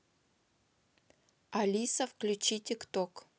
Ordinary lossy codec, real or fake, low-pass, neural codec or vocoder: none; real; none; none